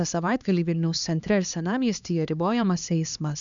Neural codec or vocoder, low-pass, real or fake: codec, 16 kHz, 2 kbps, X-Codec, HuBERT features, trained on LibriSpeech; 7.2 kHz; fake